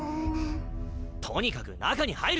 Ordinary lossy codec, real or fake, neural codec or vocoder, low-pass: none; real; none; none